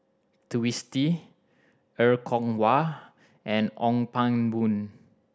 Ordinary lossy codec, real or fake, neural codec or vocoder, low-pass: none; real; none; none